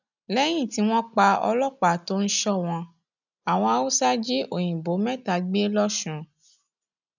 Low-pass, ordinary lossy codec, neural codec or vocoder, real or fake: 7.2 kHz; none; none; real